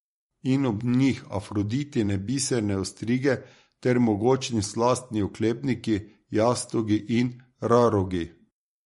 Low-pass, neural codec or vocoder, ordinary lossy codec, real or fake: 19.8 kHz; vocoder, 44.1 kHz, 128 mel bands every 512 samples, BigVGAN v2; MP3, 48 kbps; fake